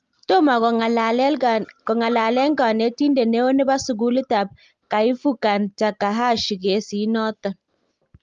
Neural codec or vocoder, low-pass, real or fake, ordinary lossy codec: none; 7.2 kHz; real; Opus, 32 kbps